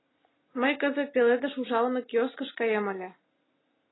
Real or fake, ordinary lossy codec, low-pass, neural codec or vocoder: real; AAC, 16 kbps; 7.2 kHz; none